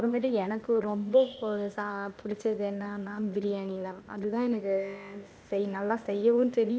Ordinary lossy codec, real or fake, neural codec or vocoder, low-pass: none; fake; codec, 16 kHz, 0.8 kbps, ZipCodec; none